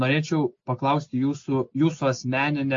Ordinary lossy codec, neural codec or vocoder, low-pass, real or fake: AAC, 48 kbps; none; 7.2 kHz; real